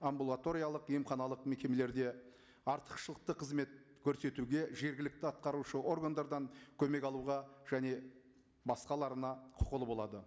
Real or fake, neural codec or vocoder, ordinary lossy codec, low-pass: real; none; none; none